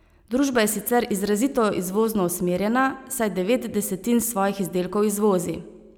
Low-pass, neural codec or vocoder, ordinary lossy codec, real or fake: none; none; none; real